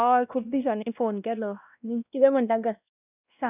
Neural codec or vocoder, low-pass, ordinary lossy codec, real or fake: codec, 16 kHz, 1 kbps, X-Codec, WavLM features, trained on Multilingual LibriSpeech; 3.6 kHz; none; fake